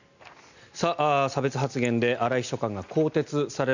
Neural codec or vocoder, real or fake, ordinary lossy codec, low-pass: none; real; none; 7.2 kHz